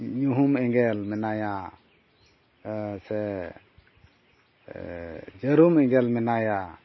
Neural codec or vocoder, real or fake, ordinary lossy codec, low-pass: none; real; MP3, 24 kbps; 7.2 kHz